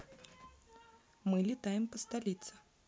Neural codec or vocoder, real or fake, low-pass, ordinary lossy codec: none; real; none; none